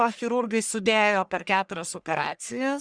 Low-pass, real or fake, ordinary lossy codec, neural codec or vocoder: 9.9 kHz; fake; Opus, 64 kbps; codec, 44.1 kHz, 1.7 kbps, Pupu-Codec